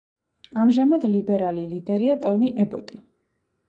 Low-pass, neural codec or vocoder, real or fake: 9.9 kHz; codec, 44.1 kHz, 2.6 kbps, SNAC; fake